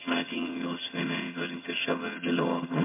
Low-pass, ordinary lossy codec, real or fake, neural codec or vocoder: 3.6 kHz; MP3, 32 kbps; fake; vocoder, 22.05 kHz, 80 mel bands, HiFi-GAN